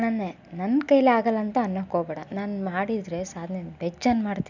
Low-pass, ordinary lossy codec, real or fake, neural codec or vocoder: 7.2 kHz; none; real; none